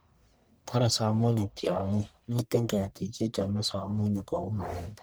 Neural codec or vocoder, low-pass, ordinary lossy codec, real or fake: codec, 44.1 kHz, 1.7 kbps, Pupu-Codec; none; none; fake